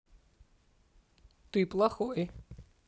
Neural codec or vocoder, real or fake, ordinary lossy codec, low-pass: none; real; none; none